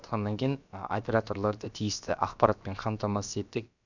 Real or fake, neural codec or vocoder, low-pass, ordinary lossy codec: fake; codec, 16 kHz, about 1 kbps, DyCAST, with the encoder's durations; 7.2 kHz; none